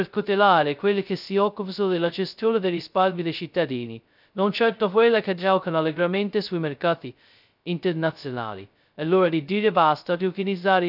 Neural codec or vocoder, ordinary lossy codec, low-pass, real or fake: codec, 16 kHz, 0.2 kbps, FocalCodec; none; 5.4 kHz; fake